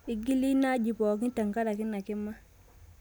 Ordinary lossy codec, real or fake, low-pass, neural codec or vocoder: none; real; none; none